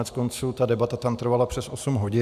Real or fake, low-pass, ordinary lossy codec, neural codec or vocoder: real; 14.4 kHz; AAC, 96 kbps; none